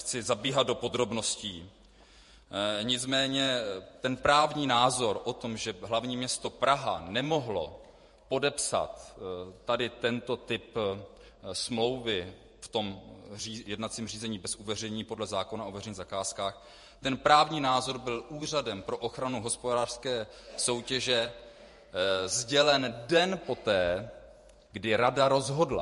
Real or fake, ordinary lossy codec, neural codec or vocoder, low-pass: fake; MP3, 48 kbps; vocoder, 48 kHz, 128 mel bands, Vocos; 14.4 kHz